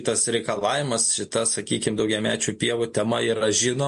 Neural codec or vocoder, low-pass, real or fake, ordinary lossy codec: none; 14.4 kHz; real; MP3, 48 kbps